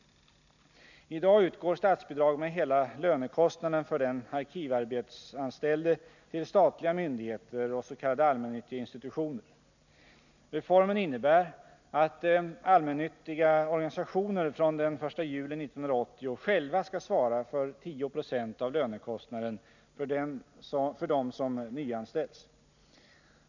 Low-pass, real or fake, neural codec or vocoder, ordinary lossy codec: 7.2 kHz; real; none; none